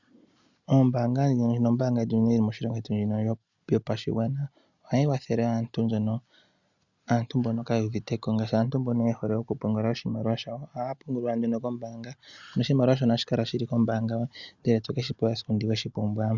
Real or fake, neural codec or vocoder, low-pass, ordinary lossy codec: real; none; 7.2 kHz; Opus, 64 kbps